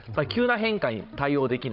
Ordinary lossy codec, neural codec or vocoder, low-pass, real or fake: none; codec, 16 kHz, 16 kbps, FreqCodec, larger model; 5.4 kHz; fake